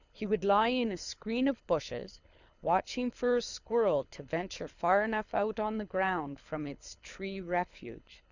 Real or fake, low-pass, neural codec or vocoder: fake; 7.2 kHz; codec, 24 kHz, 6 kbps, HILCodec